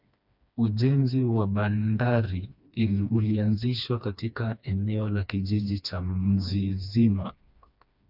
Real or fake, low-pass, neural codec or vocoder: fake; 5.4 kHz; codec, 16 kHz, 2 kbps, FreqCodec, smaller model